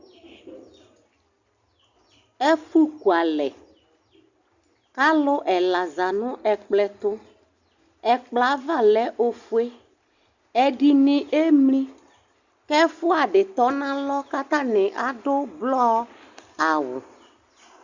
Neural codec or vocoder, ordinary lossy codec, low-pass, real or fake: none; Opus, 64 kbps; 7.2 kHz; real